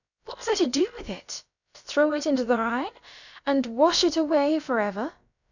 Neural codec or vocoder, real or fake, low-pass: codec, 16 kHz, about 1 kbps, DyCAST, with the encoder's durations; fake; 7.2 kHz